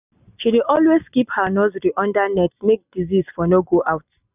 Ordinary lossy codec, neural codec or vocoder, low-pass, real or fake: none; none; 3.6 kHz; real